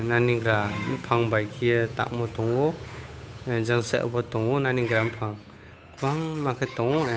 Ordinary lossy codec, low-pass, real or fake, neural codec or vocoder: none; none; fake; codec, 16 kHz, 8 kbps, FunCodec, trained on Chinese and English, 25 frames a second